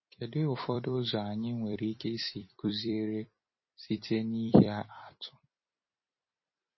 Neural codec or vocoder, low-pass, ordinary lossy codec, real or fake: vocoder, 44.1 kHz, 128 mel bands every 256 samples, BigVGAN v2; 7.2 kHz; MP3, 24 kbps; fake